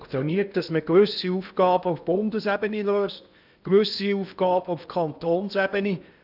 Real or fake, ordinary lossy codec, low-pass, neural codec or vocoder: fake; none; 5.4 kHz; codec, 16 kHz in and 24 kHz out, 0.8 kbps, FocalCodec, streaming, 65536 codes